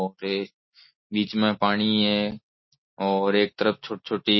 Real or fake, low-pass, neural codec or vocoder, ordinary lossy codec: real; 7.2 kHz; none; MP3, 24 kbps